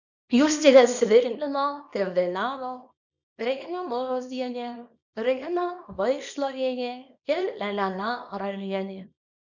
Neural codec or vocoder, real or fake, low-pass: codec, 24 kHz, 0.9 kbps, WavTokenizer, small release; fake; 7.2 kHz